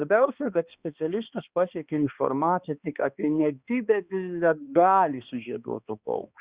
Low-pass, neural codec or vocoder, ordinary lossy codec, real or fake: 3.6 kHz; codec, 16 kHz, 2 kbps, X-Codec, HuBERT features, trained on balanced general audio; Opus, 64 kbps; fake